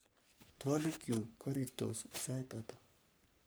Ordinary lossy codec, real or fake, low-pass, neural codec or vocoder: none; fake; none; codec, 44.1 kHz, 3.4 kbps, Pupu-Codec